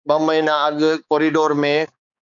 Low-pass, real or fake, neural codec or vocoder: 7.2 kHz; fake; codec, 16 kHz, 4 kbps, X-Codec, HuBERT features, trained on balanced general audio